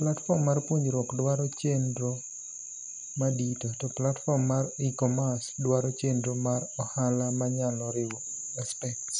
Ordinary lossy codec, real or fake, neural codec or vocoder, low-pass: none; real; none; 10.8 kHz